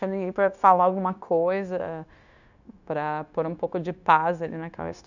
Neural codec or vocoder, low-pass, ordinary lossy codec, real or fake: codec, 16 kHz, 0.9 kbps, LongCat-Audio-Codec; 7.2 kHz; none; fake